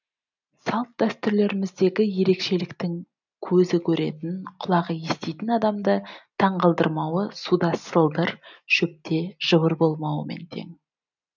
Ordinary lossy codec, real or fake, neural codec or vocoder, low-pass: none; real; none; 7.2 kHz